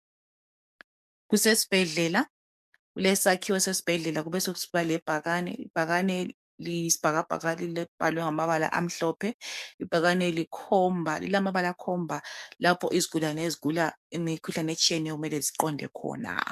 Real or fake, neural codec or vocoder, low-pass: fake; codec, 44.1 kHz, 7.8 kbps, DAC; 14.4 kHz